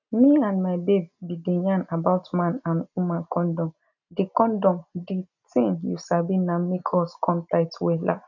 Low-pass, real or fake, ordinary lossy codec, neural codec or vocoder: 7.2 kHz; real; none; none